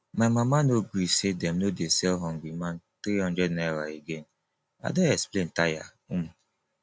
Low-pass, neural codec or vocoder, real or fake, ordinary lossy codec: none; none; real; none